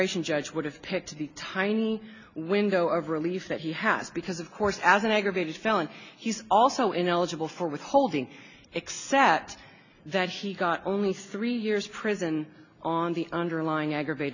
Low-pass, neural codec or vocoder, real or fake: 7.2 kHz; none; real